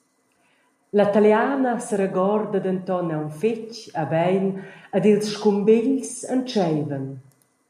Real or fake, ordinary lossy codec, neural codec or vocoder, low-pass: real; AAC, 96 kbps; none; 14.4 kHz